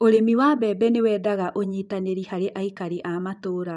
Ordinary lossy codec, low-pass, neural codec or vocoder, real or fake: none; 10.8 kHz; vocoder, 24 kHz, 100 mel bands, Vocos; fake